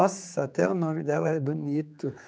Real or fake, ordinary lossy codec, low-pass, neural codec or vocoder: fake; none; none; codec, 16 kHz, 4 kbps, X-Codec, HuBERT features, trained on general audio